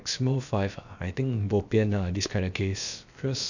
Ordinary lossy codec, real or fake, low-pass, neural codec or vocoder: none; fake; 7.2 kHz; codec, 16 kHz, about 1 kbps, DyCAST, with the encoder's durations